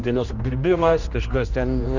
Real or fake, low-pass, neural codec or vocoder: fake; 7.2 kHz; codec, 16 kHz, 1 kbps, X-Codec, HuBERT features, trained on general audio